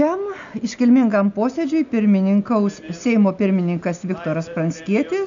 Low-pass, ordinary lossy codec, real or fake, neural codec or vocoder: 7.2 kHz; MP3, 64 kbps; real; none